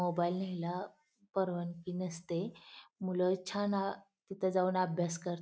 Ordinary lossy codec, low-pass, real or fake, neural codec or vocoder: none; none; real; none